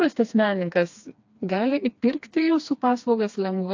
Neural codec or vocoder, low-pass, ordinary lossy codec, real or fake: codec, 16 kHz, 2 kbps, FreqCodec, smaller model; 7.2 kHz; AAC, 48 kbps; fake